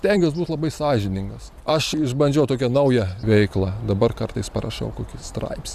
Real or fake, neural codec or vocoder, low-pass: fake; vocoder, 44.1 kHz, 128 mel bands every 512 samples, BigVGAN v2; 14.4 kHz